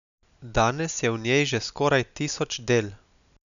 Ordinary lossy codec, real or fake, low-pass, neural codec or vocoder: none; real; 7.2 kHz; none